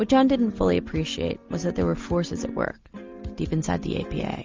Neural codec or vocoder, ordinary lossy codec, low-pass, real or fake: none; Opus, 32 kbps; 7.2 kHz; real